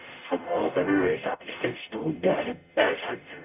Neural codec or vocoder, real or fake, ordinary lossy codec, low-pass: codec, 44.1 kHz, 0.9 kbps, DAC; fake; none; 3.6 kHz